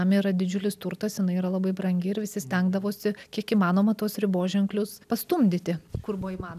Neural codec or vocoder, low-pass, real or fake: none; 14.4 kHz; real